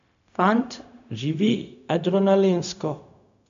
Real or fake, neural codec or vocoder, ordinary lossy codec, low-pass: fake; codec, 16 kHz, 0.4 kbps, LongCat-Audio-Codec; AAC, 96 kbps; 7.2 kHz